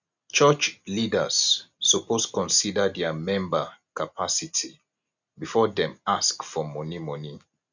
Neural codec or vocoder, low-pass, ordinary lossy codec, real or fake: none; 7.2 kHz; none; real